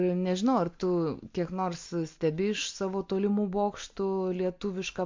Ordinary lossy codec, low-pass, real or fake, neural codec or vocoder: MP3, 48 kbps; 7.2 kHz; real; none